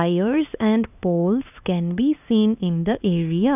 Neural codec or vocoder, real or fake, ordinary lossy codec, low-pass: codec, 16 kHz, 2 kbps, X-Codec, HuBERT features, trained on LibriSpeech; fake; none; 3.6 kHz